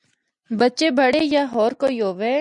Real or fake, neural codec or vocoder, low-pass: real; none; 10.8 kHz